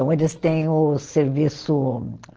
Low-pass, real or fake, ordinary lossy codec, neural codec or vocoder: 7.2 kHz; real; Opus, 16 kbps; none